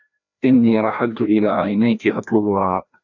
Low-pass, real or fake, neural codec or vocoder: 7.2 kHz; fake; codec, 16 kHz, 1 kbps, FreqCodec, larger model